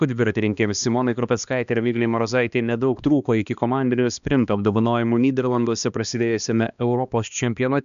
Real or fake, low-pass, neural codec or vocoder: fake; 7.2 kHz; codec, 16 kHz, 2 kbps, X-Codec, HuBERT features, trained on balanced general audio